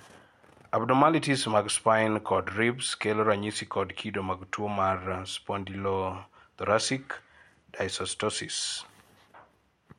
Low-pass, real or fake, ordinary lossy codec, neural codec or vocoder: 19.8 kHz; real; MP3, 64 kbps; none